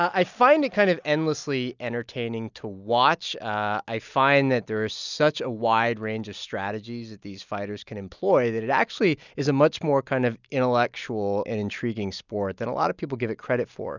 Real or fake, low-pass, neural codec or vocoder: real; 7.2 kHz; none